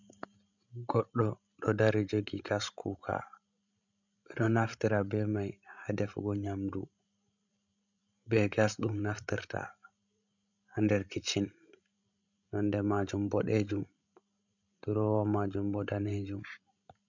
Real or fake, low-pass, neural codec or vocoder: fake; 7.2 kHz; codec, 16 kHz, 16 kbps, FreqCodec, larger model